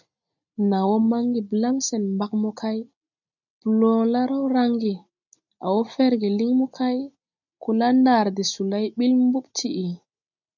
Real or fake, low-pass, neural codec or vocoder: real; 7.2 kHz; none